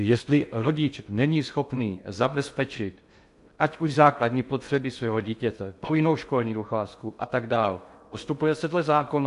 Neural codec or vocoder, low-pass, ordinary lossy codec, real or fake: codec, 16 kHz in and 24 kHz out, 0.6 kbps, FocalCodec, streaming, 2048 codes; 10.8 kHz; AAC, 64 kbps; fake